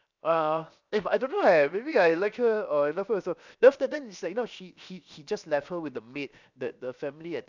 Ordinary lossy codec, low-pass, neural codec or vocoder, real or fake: none; 7.2 kHz; codec, 16 kHz, 0.7 kbps, FocalCodec; fake